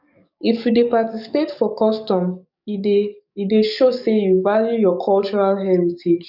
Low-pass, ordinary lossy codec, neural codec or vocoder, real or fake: 5.4 kHz; none; codec, 44.1 kHz, 7.8 kbps, DAC; fake